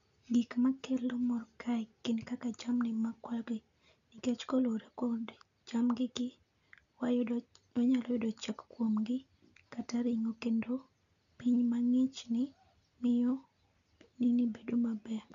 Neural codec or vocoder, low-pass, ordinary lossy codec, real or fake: none; 7.2 kHz; none; real